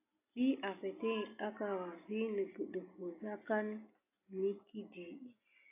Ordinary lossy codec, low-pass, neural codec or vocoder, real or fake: AAC, 32 kbps; 3.6 kHz; none; real